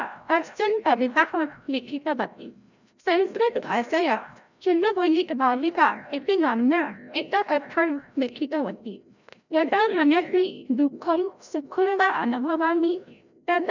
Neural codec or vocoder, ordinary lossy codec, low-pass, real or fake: codec, 16 kHz, 0.5 kbps, FreqCodec, larger model; none; 7.2 kHz; fake